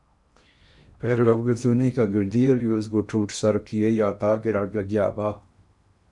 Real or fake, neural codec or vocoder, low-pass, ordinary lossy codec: fake; codec, 16 kHz in and 24 kHz out, 0.8 kbps, FocalCodec, streaming, 65536 codes; 10.8 kHz; MP3, 96 kbps